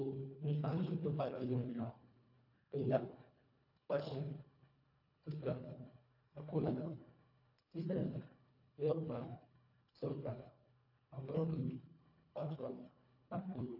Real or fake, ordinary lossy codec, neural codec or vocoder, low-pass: fake; AAC, 48 kbps; codec, 24 kHz, 1.5 kbps, HILCodec; 5.4 kHz